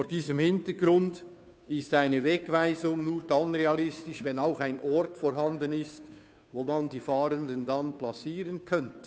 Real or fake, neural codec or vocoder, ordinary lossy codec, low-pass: fake; codec, 16 kHz, 2 kbps, FunCodec, trained on Chinese and English, 25 frames a second; none; none